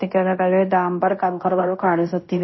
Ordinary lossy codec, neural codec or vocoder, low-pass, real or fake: MP3, 24 kbps; codec, 16 kHz in and 24 kHz out, 0.9 kbps, LongCat-Audio-Codec, fine tuned four codebook decoder; 7.2 kHz; fake